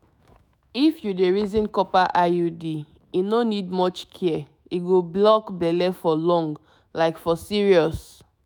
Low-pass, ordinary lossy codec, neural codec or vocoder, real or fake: none; none; autoencoder, 48 kHz, 128 numbers a frame, DAC-VAE, trained on Japanese speech; fake